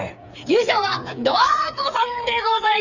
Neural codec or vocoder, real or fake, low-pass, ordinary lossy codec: codec, 16 kHz, 4 kbps, FreqCodec, smaller model; fake; 7.2 kHz; none